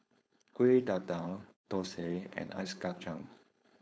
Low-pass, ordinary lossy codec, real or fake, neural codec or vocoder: none; none; fake; codec, 16 kHz, 4.8 kbps, FACodec